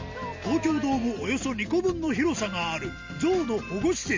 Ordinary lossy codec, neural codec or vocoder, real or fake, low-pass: Opus, 32 kbps; none; real; 7.2 kHz